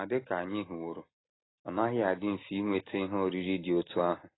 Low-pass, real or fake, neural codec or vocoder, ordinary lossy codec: 7.2 kHz; real; none; AAC, 16 kbps